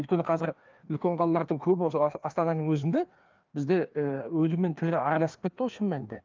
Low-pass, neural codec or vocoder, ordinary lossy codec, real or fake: 7.2 kHz; codec, 16 kHz, 2 kbps, FreqCodec, larger model; Opus, 32 kbps; fake